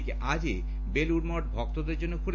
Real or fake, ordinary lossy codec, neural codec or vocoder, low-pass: real; AAC, 48 kbps; none; 7.2 kHz